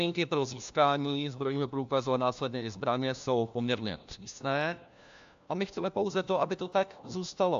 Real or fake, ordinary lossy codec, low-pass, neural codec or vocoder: fake; AAC, 64 kbps; 7.2 kHz; codec, 16 kHz, 1 kbps, FunCodec, trained on LibriTTS, 50 frames a second